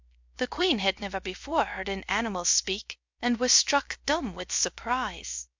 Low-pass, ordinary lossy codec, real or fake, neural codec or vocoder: 7.2 kHz; MP3, 64 kbps; fake; codec, 16 kHz, 0.3 kbps, FocalCodec